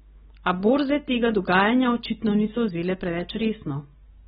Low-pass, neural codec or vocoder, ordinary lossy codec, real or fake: 19.8 kHz; none; AAC, 16 kbps; real